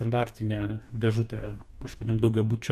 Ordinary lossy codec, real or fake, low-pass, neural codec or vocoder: AAC, 96 kbps; fake; 14.4 kHz; codec, 44.1 kHz, 2.6 kbps, DAC